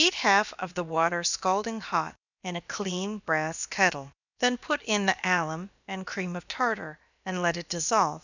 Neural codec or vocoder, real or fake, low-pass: codec, 16 kHz, about 1 kbps, DyCAST, with the encoder's durations; fake; 7.2 kHz